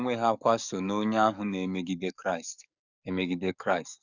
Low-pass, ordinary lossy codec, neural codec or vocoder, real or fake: 7.2 kHz; none; codec, 44.1 kHz, 7.8 kbps, DAC; fake